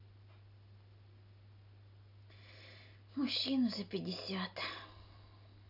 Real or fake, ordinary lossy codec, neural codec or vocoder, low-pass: real; none; none; 5.4 kHz